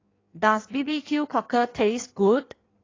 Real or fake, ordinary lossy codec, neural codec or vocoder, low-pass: fake; AAC, 32 kbps; codec, 16 kHz in and 24 kHz out, 1.1 kbps, FireRedTTS-2 codec; 7.2 kHz